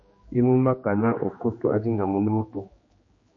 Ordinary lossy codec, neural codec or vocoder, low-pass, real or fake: MP3, 32 kbps; codec, 16 kHz, 2 kbps, X-Codec, HuBERT features, trained on general audio; 7.2 kHz; fake